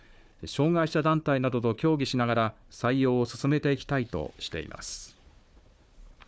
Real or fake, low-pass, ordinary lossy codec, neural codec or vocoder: fake; none; none; codec, 16 kHz, 4 kbps, FunCodec, trained on Chinese and English, 50 frames a second